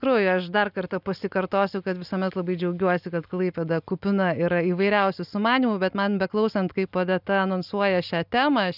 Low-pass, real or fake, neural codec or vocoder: 5.4 kHz; real; none